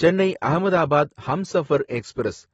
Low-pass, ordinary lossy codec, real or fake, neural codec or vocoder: 19.8 kHz; AAC, 24 kbps; real; none